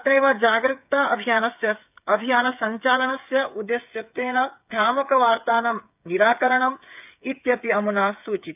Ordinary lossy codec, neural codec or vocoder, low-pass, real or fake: none; codec, 16 kHz, 8 kbps, FreqCodec, smaller model; 3.6 kHz; fake